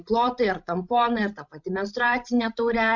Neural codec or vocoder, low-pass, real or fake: codec, 16 kHz, 16 kbps, FreqCodec, larger model; 7.2 kHz; fake